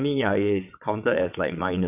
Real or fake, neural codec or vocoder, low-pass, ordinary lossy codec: fake; vocoder, 22.05 kHz, 80 mel bands, WaveNeXt; 3.6 kHz; none